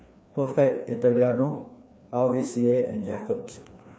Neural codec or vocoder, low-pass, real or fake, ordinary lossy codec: codec, 16 kHz, 2 kbps, FreqCodec, larger model; none; fake; none